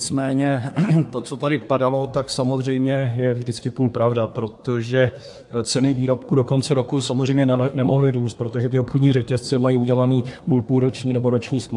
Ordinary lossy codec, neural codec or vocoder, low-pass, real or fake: AAC, 64 kbps; codec, 24 kHz, 1 kbps, SNAC; 10.8 kHz; fake